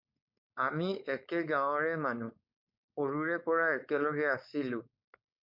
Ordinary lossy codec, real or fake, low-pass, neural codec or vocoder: MP3, 48 kbps; fake; 5.4 kHz; codec, 16 kHz, 4.8 kbps, FACodec